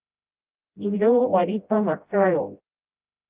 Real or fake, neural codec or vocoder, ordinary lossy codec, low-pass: fake; codec, 16 kHz, 0.5 kbps, FreqCodec, smaller model; Opus, 32 kbps; 3.6 kHz